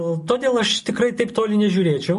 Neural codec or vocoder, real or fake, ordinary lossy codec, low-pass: none; real; MP3, 48 kbps; 14.4 kHz